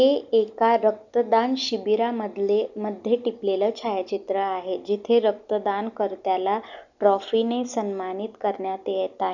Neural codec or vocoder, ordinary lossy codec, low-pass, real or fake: none; none; 7.2 kHz; real